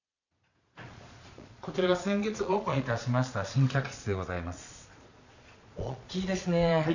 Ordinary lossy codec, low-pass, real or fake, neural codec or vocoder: none; 7.2 kHz; fake; vocoder, 22.05 kHz, 80 mel bands, Vocos